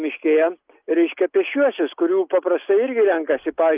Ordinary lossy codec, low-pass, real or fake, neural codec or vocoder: Opus, 24 kbps; 3.6 kHz; real; none